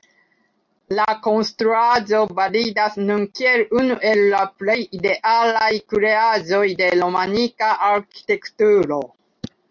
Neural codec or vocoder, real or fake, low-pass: none; real; 7.2 kHz